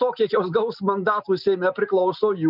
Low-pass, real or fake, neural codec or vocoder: 5.4 kHz; real; none